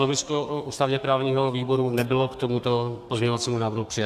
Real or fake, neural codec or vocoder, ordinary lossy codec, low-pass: fake; codec, 32 kHz, 1.9 kbps, SNAC; AAC, 96 kbps; 14.4 kHz